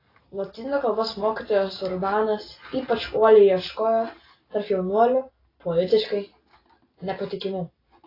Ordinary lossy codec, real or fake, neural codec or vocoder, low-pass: AAC, 24 kbps; real; none; 5.4 kHz